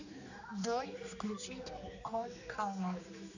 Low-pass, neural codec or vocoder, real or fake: 7.2 kHz; codec, 16 kHz, 2 kbps, X-Codec, HuBERT features, trained on general audio; fake